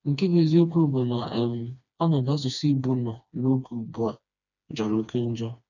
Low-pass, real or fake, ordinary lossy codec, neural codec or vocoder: 7.2 kHz; fake; none; codec, 16 kHz, 2 kbps, FreqCodec, smaller model